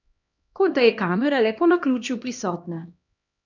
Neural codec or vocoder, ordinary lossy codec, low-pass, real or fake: codec, 16 kHz, 1 kbps, X-Codec, HuBERT features, trained on LibriSpeech; none; 7.2 kHz; fake